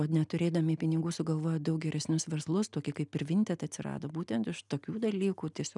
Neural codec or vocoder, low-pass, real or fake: none; 10.8 kHz; real